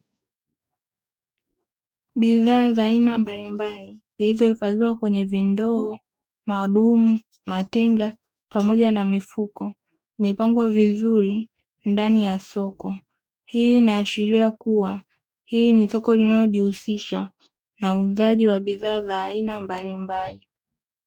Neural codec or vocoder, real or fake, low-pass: codec, 44.1 kHz, 2.6 kbps, DAC; fake; 19.8 kHz